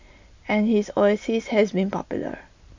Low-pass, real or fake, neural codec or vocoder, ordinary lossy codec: 7.2 kHz; real; none; MP3, 64 kbps